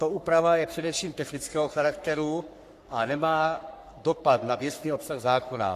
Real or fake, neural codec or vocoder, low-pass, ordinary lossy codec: fake; codec, 44.1 kHz, 3.4 kbps, Pupu-Codec; 14.4 kHz; AAC, 64 kbps